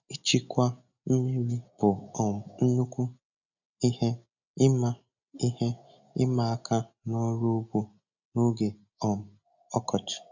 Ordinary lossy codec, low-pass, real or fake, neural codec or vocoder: none; 7.2 kHz; real; none